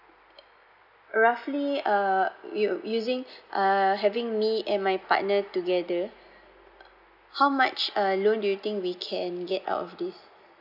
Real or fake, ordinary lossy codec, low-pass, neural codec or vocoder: real; none; 5.4 kHz; none